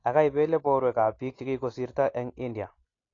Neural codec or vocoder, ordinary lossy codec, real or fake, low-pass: none; AAC, 32 kbps; real; 7.2 kHz